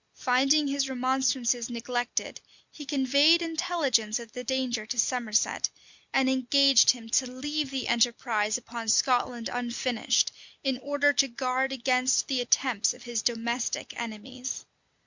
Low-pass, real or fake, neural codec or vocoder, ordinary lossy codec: 7.2 kHz; real; none; Opus, 64 kbps